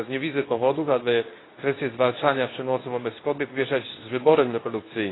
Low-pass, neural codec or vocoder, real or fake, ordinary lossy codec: 7.2 kHz; codec, 24 kHz, 0.9 kbps, WavTokenizer, medium speech release version 2; fake; AAC, 16 kbps